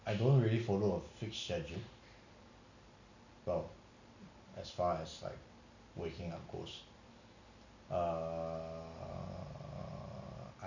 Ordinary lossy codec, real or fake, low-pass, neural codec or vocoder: none; real; 7.2 kHz; none